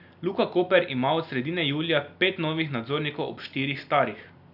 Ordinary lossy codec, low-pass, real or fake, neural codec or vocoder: none; 5.4 kHz; real; none